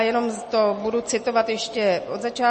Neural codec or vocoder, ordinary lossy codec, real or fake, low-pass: none; MP3, 32 kbps; real; 10.8 kHz